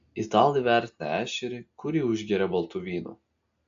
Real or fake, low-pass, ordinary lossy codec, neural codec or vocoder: real; 7.2 kHz; AAC, 64 kbps; none